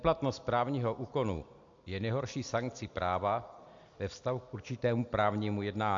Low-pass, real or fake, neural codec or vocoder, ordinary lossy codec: 7.2 kHz; real; none; AAC, 64 kbps